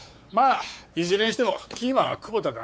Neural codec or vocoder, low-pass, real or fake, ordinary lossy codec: codec, 16 kHz, 4 kbps, X-Codec, WavLM features, trained on Multilingual LibriSpeech; none; fake; none